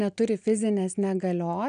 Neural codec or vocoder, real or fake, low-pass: none; real; 9.9 kHz